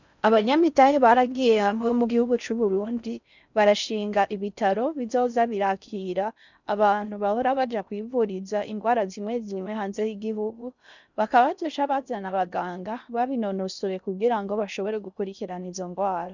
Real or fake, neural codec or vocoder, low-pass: fake; codec, 16 kHz in and 24 kHz out, 0.6 kbps, FocalCodec, streaming, 4096 codes; 7.2 kHz